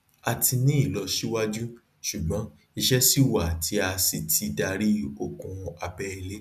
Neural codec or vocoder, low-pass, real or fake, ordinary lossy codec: none; 14.4 kHz; real; none